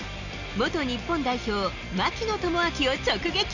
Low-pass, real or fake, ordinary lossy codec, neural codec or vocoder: 7.2 kHz; real; none; none